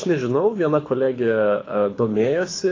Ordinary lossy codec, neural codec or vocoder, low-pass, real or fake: AAC, 32 kbps; codec, 24 kHz, 6 kbps, HILCodec; 7.2 kHz; fake